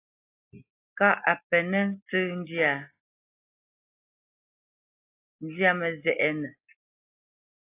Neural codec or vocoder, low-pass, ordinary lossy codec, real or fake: none; 3.6 kHz; AAC, 24 kbps; real